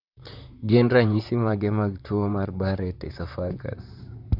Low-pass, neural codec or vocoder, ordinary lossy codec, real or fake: 5.4 kHz; vocoder, 44.1 kHz, 128 mel bands, Pupu-Vocoder; none; fake